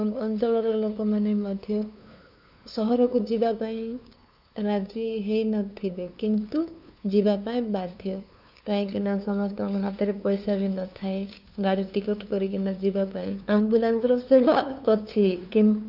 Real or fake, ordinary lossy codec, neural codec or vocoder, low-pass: fake; none; codec, 16 kHz, 2 kbps, FunCodec, trained on LibriTTS, 25 frames a second; 5.4 kHz